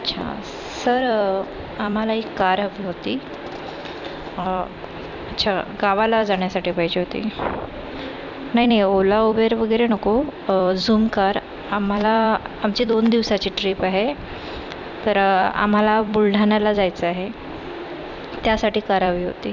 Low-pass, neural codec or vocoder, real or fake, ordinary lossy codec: 7.2 kHz; vocoder, 44.1 kHz, 128 mel bands every 256 samples, BigVGAN v2; fake; none